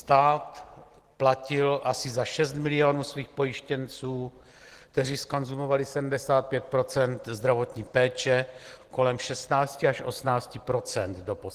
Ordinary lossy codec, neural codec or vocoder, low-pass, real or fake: Opus, 16 kbps; none; 14.4 kHz; real